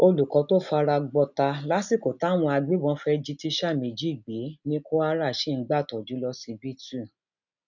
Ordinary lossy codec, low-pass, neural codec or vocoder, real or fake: none; 7.2 kHz; none; real